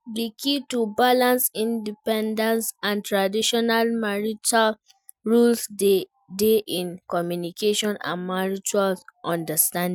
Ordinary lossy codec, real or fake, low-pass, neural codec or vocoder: none; real; none; none